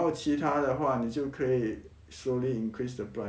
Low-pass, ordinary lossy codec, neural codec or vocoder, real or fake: none; none; none; real